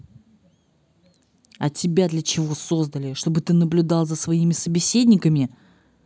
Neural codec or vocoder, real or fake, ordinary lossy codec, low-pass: none; real; none; none